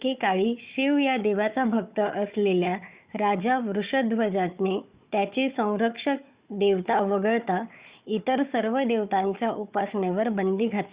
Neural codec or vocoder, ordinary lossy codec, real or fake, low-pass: codec, 16 kHz, 4 kbps, FunCodec, trained on Chinese and English, 50 frames a second; Opus, 64 kbps; fake; 3.6 kHz